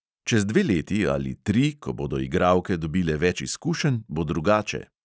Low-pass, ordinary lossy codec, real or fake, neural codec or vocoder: none; none; real; none